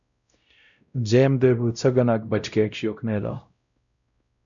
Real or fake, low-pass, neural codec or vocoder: fake; 7.2 kHz; codec, 16 kHz, 0.5 kbps, X-Codec, WavLM features, trained on Multilingual LibriSpeech